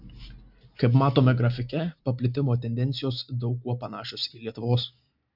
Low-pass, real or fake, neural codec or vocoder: 5.4 kHz; real; none